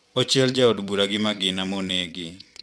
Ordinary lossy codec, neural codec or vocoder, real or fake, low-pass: none; vocoder, 22.05 kHz, 80 mel bands, WaveNeXt; fake; none